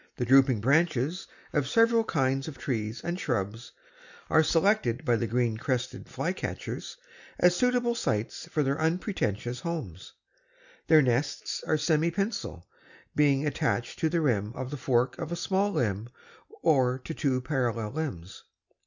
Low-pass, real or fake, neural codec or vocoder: 7.2 kHz; real; none